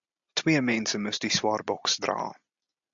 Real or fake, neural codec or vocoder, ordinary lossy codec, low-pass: real; none; AAC, 64 kbps; 7.2 kHz